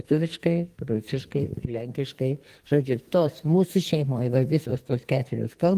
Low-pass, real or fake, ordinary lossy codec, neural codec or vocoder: 14.4 kHz; fake; Opus, 24 kbps; codec, 44.1 kHz, 2.6 kbps, SNAC